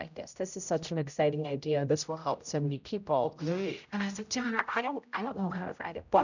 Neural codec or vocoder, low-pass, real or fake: codec, 16 kHz, 0.5 kbps, X-Codec, HuBERT features, trained on general audio; 7.2 kHz; fake